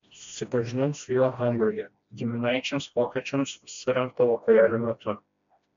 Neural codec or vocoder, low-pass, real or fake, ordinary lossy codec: codec, 16 kHz, 1 kbps, FreqCodec, smaller model; 7.2 kHz; fake; MP3, 64 kbps